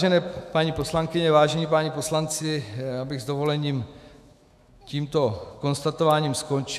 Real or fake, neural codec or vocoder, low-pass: fake; autoencoder, 48 kHz, 128 numbers a frame, DAC-VAE, trained on Japanese speech; 14.4 kHz